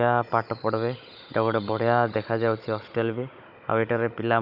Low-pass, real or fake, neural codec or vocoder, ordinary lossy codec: 5.4 kHz; fake; codec, 16 kHz, 16 kbps, FunCodec, trained on Chinese and English, 50 frames a second; none